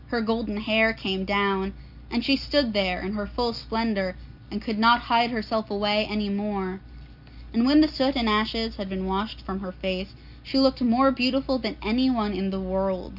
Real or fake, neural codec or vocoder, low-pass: real; none; 5.4 kHz